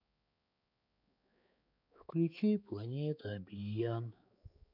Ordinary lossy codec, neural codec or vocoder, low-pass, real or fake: none; codec, 16 kHz, 4 kbps, X-Codec, HuBERT features, trained on balanced general audio; 5.4 kHz; fake